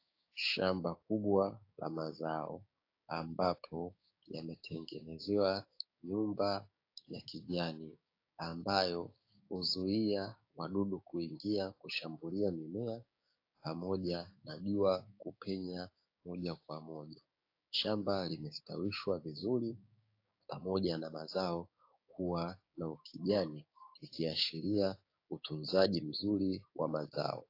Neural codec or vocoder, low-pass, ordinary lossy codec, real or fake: codec, 16 kHz, 6 kbps, DAC; 5.4 kHz; AAC, 32 kbps; fake